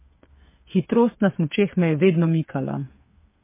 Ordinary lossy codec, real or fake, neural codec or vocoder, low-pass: MP3, 24 kbps; fake; codec, 16 kHz, 8 kbps, FreqCodec, smaller model; 3.6 kHz